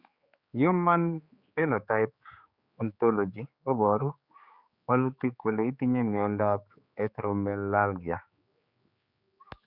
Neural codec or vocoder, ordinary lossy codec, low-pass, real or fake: codec, 16 kHz, 4 kbps, X-Codec, HuBERT features, trained on general audio; Opus, 64 kbps; 5.4 kHz; fake